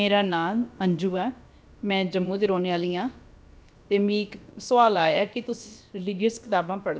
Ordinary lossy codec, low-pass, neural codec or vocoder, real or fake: none; none; codec, 16 kHz, about 1 kbps, DyCAST, with the encoder's durations; fake